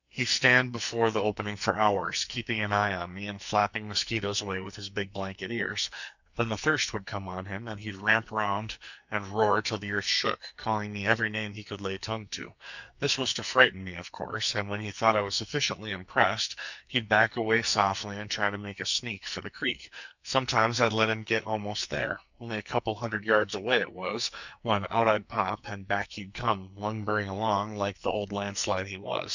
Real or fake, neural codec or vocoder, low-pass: fake; codec, 44.1 kHz, 2.6 kbps, SNAC; 7.2 kHz